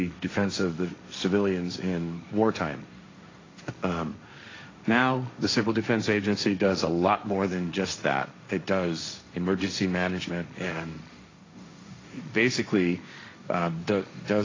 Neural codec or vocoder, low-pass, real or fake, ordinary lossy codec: codec, 16 kHz, 1.1 kbps, Voila-Tokenizer; 7.2 kHz; fake; AAC, 32 kbps